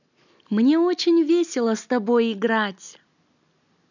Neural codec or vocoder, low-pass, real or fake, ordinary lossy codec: none; 7.2 kHz; real; none